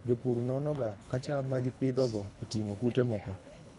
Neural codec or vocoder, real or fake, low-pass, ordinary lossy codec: codec, 24 kHz, 3 kbps, HILCodec; fake; 10.8 kHz; none